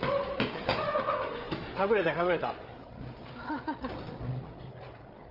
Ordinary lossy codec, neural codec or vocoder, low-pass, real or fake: Opus, 24 kbps; codec, 16 kHz, 8 kbps, FreqCodec, larger model; 5.4 kHz; fake